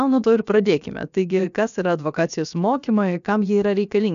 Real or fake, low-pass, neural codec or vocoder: fake; 7.2 kHz; codec, 16 kHz, about 1 kbps, DyCAST, with the encoder's durations